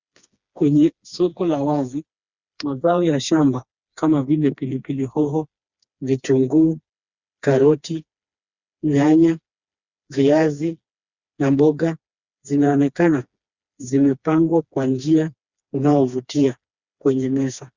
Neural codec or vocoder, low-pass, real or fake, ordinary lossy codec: codec, 16 kHz, 2 kbps, FreqCodec, smaller model; 7.2 kHz; fake; Opus, 64 kbps